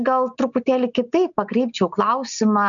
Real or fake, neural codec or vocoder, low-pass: real; none; 7.2 kHz